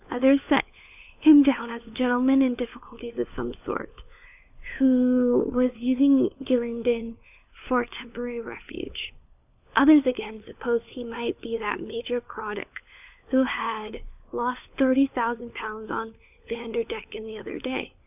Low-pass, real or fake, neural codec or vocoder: 3.6 kHz; fake; codec, 16 kHz, 4 kbps, FunCodec, trained on LibriTTS, 50 frames a second